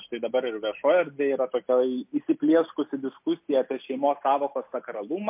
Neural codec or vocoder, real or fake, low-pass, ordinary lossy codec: none; real; 3.6 kHz; MP3, 32 kbps